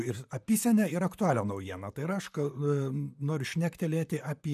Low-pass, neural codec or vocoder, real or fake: 14.4 kHz; vocoder, 44.1 kHz, 128 mel bands, Pupu-Vocoder; fake